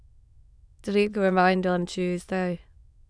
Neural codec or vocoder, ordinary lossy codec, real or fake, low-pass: autoencoder, 22.05 kHz, a latent of 192 numbers a frame, VITS, trained on many speakers; none; fake; none